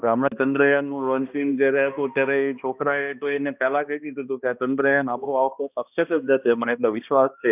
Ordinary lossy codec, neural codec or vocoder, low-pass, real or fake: none; codec, 16 kHz, 1 kbps, X-Codec, HuBERT features, trained on balanced general audio; 3.6 kHz; fake